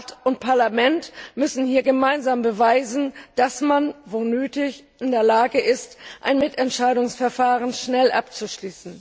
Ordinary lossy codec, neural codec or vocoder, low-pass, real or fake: none; none; none; real